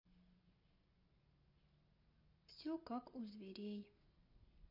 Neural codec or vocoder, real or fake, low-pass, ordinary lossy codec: none; real; 5.4 kHz; MP3, 32 kbps